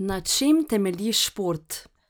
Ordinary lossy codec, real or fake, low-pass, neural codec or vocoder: none; real; none; none